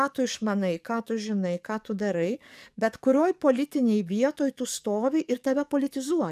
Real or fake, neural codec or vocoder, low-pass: fake; codec, 44.1 kHz, 7.8 kbps, DAC; 14.4 kHz